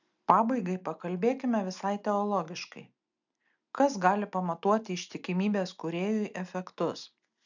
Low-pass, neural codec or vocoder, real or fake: 7.2 kHz; none; real